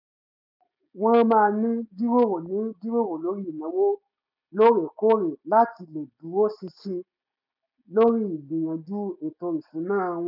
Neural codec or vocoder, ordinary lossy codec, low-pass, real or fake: none; none; 5.4 kHz; real